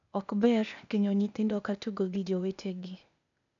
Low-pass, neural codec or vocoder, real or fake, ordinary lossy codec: 7.2 kHz; codec, 16 kHz, 0.8 kbps, ZipCodec; fake; none